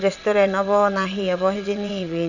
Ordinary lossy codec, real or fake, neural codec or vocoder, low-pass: none; fake; vocoder, 22.05 kHz, 80 mel bands, WaveNeXt; 7.2 kHz